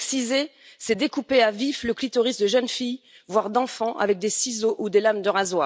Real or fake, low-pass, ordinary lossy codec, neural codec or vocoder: real; none; none; none